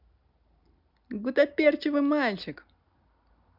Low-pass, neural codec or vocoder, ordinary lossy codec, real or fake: 5.4 kHz; none; none; real